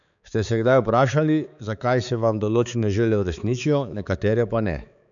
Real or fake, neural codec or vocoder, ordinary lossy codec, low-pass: fake; codec, 16 kHz, 4 kbps, X-Codec, HuBERT features, trained on balanced general audio; none; 7.2 kHz